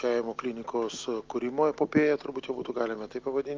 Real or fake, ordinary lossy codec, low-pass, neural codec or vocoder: real; Opus, 24 kbps; 7.2 kHz; none